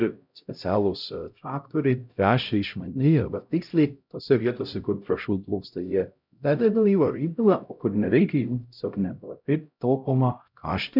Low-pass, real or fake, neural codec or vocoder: 5.4 kHz; fake; codec, 16 kHz, 0.5 kbps, X-Codec, HuBERT features, trained on LibriSpeech